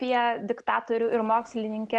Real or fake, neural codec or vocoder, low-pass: real; none; 10.8 kHz